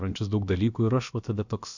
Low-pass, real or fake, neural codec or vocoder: 7.2 kHz; fake; codec, 16 kHz, about 1 kbps, DyCAST, with the encoder's durations